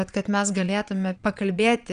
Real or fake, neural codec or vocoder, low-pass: fake; vocoder, 22.05 kHz, 80 mel bands, Vocos; 9.9 kHz